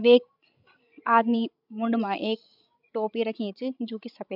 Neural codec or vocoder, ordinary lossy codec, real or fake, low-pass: codec, 16 kHz, 16 kbps, FreqCodec, larger model; none; fake; 5.4 kHz